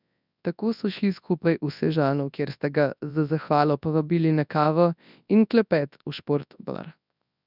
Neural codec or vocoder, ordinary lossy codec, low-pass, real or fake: codec, 24 kHz, 0.9 kbps, WavTokenizer, large speech release; none; 5.4 kHz; fake